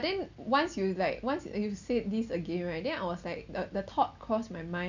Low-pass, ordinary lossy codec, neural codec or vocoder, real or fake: 7.2 kHz; none; none; real